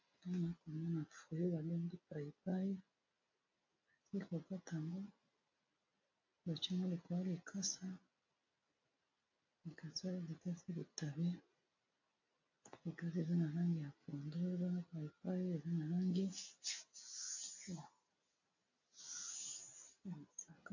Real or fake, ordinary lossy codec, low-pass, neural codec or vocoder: real; AAC, 48 kbps; 7.2 kHz; none